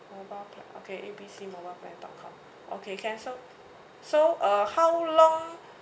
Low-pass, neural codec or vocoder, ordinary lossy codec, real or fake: none; none; none; real